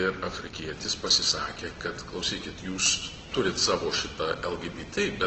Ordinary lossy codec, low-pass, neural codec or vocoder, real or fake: Opus, 16 kbps; 7.2 kHz; none; real